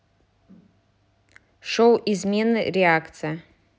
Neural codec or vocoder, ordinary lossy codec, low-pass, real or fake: none; none; none; real